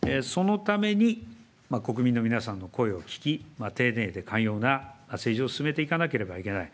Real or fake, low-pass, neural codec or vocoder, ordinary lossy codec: real; none; none; none